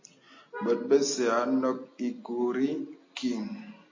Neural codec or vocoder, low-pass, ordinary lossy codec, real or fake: none; 7.2 kHz; MP3, 32 kbps; real